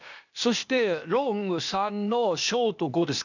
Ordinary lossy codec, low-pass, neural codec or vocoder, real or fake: none; 7.2 kHz; codec, 16 kHz, 0.8 kbps, ZipCodec; fake